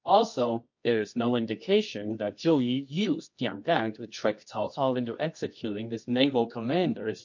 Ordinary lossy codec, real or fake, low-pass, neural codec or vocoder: MP3, 48 kbps; fake; 7.2 kHz; codec, 24 kHz, 0.9 kbps, WavTokenizer, medium music audio release